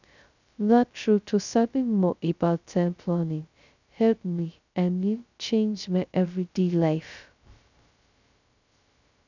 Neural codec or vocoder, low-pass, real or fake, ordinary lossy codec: codec, 16 kHz, 0.2 kbps, FocalCodec; 7.2 kHz; fake; none